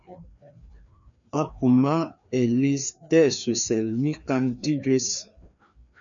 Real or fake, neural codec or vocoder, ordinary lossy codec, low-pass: fake; codec, 16 kHz, 2 kbps, FreqCodec, larger model; MP3, 96 kbps; 7.2 kHz